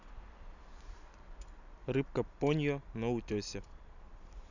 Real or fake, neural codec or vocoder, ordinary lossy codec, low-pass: fake; vocoder, 44.1 kHz, 128 mel bands every 256 samples, BigVGAN v2; none; 7.2 kHz